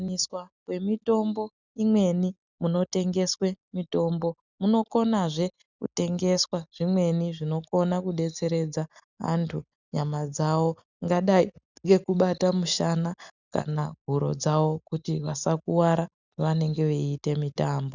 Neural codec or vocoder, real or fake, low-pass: none; real; 7.2 kHz